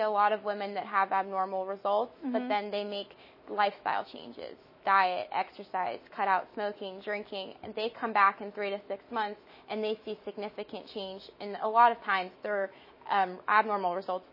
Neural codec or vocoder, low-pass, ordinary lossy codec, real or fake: autoencoder, 48 kHz, 128 numbers a frame, DAC-VAE, trained on Japanese speech; 5.4 kHz; MP3, 24 kbps; fake